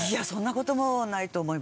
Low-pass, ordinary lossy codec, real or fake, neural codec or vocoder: none; none; real; none